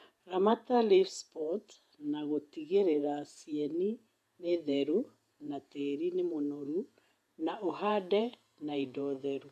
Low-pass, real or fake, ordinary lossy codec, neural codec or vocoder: 14.4 kHz; fake; AAC, 96 kbps; vocoder, 48 kHz, 128 mel bands, Vocos